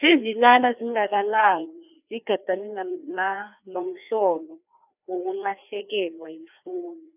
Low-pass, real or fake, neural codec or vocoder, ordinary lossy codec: 3.6 kHz; fake; codec, 16 kHz, 2 kbps, FreqCodec, larger model; none